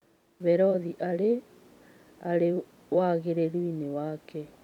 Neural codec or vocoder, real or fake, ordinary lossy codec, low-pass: vocoder, 44.1 kHz, 128 mel bands every 256 samples, BigVGAN v2; fake; none; 19.8 kHz